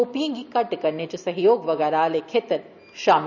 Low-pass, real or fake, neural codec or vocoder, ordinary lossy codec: 7.2 kHz; real; none; none